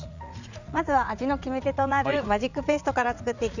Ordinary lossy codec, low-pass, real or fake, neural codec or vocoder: none; 7.2 kHz; fake; vocoder, 44.1 kHz, 80 mel bands, Vocos